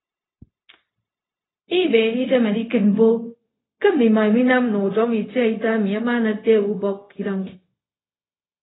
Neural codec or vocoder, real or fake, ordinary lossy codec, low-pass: codec, 16 kHz, 0.4 kbps, LongCat-Audio-Codec; fake; AAC, 16 kbps; 7.2 kHz